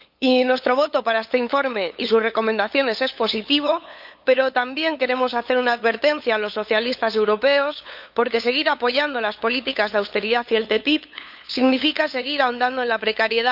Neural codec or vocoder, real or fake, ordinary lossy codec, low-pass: codec, 16 kHz, 8 kbps, FunCodec, trained on LibriTTS, 25 frames a second; fake; none; 5.4 kHz